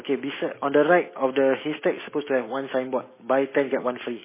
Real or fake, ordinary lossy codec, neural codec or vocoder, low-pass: real; MP3, 16 kbps; none; 3.6 kHz